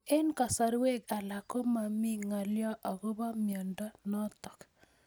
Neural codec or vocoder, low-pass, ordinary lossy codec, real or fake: none; none; none; real